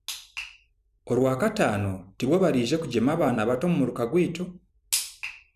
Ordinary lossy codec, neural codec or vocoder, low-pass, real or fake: none; vocoder, 44.1 kHz, 128 mel bands every 256 samples, BigVGAN v2; 14.4 kHz; fake